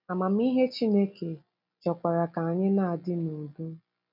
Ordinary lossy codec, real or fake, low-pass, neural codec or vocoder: none; real; 5.4 kHz; none